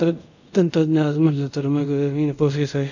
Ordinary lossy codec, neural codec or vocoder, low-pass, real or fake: none; codec, 24 kHz, 0.5 kbps, DualCodec; 7.2 kHz; fake